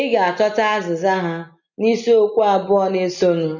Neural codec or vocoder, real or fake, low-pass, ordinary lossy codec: none; real; 7.2 kHz; none